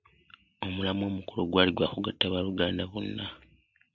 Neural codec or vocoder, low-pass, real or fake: none; 7.2 kHz; real